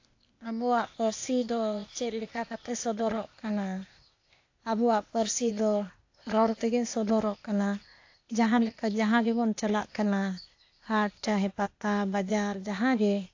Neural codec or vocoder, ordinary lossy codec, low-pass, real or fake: codec, 16 kHz, 0.8 kbps, ZipCodec; AAC, 48 kbps; 7.2 kHz; fake